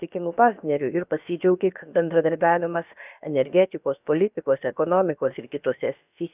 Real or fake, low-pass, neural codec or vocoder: fake; 3.6 kHz; codec, 16 kHz, about 1 kbps, DyCAST, with the encoder's durations